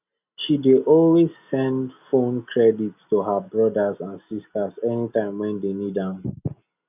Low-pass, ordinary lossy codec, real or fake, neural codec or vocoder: 3.6 kHz; none; real; none